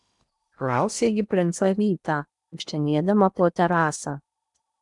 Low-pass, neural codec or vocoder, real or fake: 10.8 kHz; codec, 16 kHz in and 24 kHz out, 0.8 kbps, FocalCodec, streaming, 65536 codes; fake